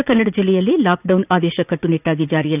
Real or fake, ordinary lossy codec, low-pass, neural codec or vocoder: fake; none; 3.6 kHz; vocoder, 44.1 kHz, 80 mel bands, Vocos